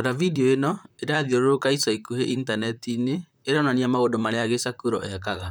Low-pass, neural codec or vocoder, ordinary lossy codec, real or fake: none; vocoder, 44.1 kHz, 128 mel bands, Pupu-Vocoder; none; fake